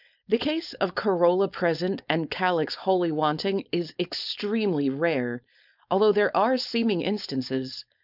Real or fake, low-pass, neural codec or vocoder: fake; 5.4 kHz; codec, 16 kHz, 4.8 kbps, FACodec